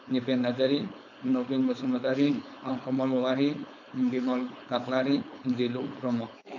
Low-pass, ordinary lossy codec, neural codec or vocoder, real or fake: 7.2 kHz; none; codec, 16 kHz, 4.8 kbps, FACodec; fake